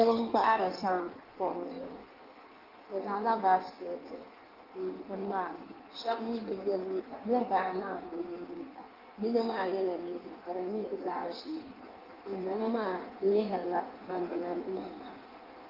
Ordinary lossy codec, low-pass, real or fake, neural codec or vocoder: Opus, 32 kbps; 5.4 kHz; fake; codec, 16 kHz in and 24 kHz out, 1.1 kbps, FireRedTTS-2 codec